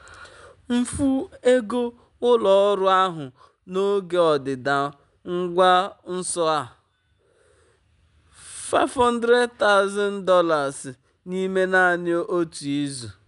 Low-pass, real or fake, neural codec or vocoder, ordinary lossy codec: 10.8 kHz; real; none; none